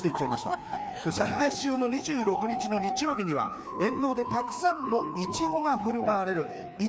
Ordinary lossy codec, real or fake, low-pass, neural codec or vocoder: none; fake; none; codec, 16 kHz, 2 kbps, FreqCodec, larger model